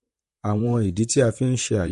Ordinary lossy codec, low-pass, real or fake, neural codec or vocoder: MP3, 48 kbps; 14.4 kHz; fake; vocoder, 44.1 kHz, 128 mel bands, Pupu-Vocoder